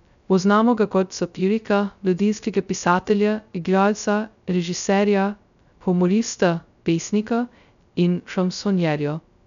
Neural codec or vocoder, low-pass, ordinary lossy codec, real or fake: codec, 16 kHz, 0.2 kbps, FocalCodec; 7.2 kHz; none; fake